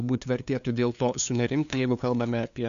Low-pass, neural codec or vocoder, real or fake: 7.2 kHz; codec, 16 kHz, 2 kbps, FunCodec, trained on LibriTTS, 25 frames a second; fake